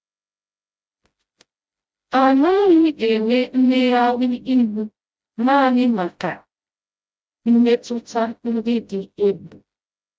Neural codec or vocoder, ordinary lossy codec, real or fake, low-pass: codec, 16 kHz, 0.5 kbps, FreqCodec, smaller model; none; fake; none